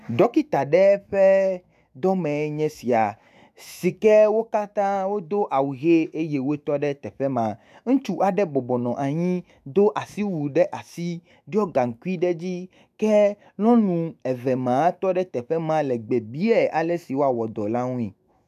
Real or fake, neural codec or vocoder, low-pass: fake; autoencoder, 48 kHz, 128 numbers a frame, DAC-VAE, trained on Japanese speech; 14.4 kHz